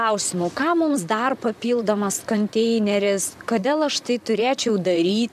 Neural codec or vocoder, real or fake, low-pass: vocoder, 44.1 kHz, 128 mel bands, Pupu-Vocoder; fake; 14.4 kHz